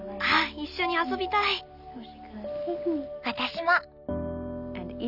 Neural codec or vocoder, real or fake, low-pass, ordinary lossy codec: none; real; 5.4 kHz; none